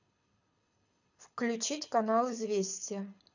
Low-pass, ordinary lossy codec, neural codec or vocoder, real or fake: 7.2 kHz; none; codec, 24 kHz, 6 kbps, HILCodec; fake